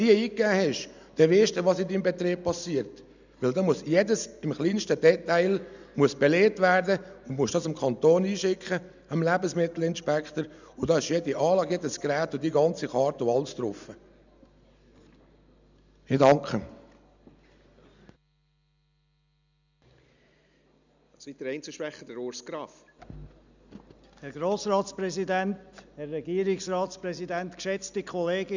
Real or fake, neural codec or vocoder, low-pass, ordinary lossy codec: real; none; 7.2 kHz; none